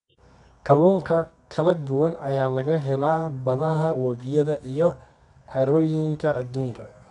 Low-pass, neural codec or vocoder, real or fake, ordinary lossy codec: 10.8 kHz; codec, 24 kHz, 0.9 kbps, WavTokenizer, medium music audio release; fake; none